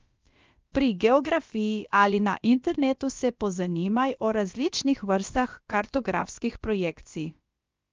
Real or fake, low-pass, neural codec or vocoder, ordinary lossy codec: fake; 7.2 kHz; codec, 16 kHz, about 1 kbps, DyCAST, with the encoder's durations; Opus, 24 kbps